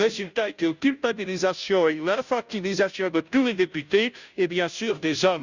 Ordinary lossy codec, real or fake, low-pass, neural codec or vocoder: Opus, 64 kbps; fake; 7.2 kHz; codec, 16 kHz, 0.5 kbps, FunCodec, trained on Chinese and English, 25 frames a second